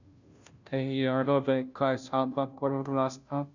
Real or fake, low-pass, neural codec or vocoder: fake; 7.2 kHz; codec, 16 kHz, 0.5 kbps, FunCodec, trained on Chinese and English, 25 frames a second